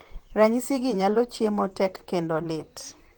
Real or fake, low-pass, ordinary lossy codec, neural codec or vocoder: fake; 19.8 kHz; Opus, 24 kbps; vocoder, 44.1 kHz, 128 mel bands, Pupu-Vocoder